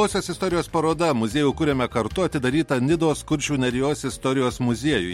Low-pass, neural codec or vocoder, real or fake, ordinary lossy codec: 19.8 kHz; none; real; MP3, 64 kbps